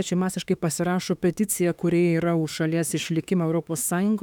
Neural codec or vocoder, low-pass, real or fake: autoencoder, 48 kHz, 32 numbers a frame, DAC-VAE, trained on Japanese speech; 19.8 kHz; fake